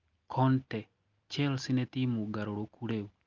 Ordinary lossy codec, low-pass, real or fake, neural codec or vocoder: Opus, 32 kbps; 7.2 kHz; real; none